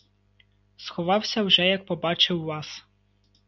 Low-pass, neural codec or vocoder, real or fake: 7.2 kHz; none; real